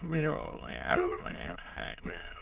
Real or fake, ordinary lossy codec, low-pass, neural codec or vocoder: fake; Opus, 16 kbps; 3.6 kHz; autoencoder, 22.05 kHz, a latent of 192 numbers a frame, VITS, trained on many speakers